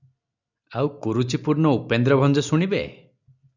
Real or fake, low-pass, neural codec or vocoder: real; 7.2 kHz; none